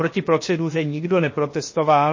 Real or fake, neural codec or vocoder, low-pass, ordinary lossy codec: fake; codec, 16 kHz, 0.7 kbps, FocalCodec; 7.2 kHz; MP3, 32 kbps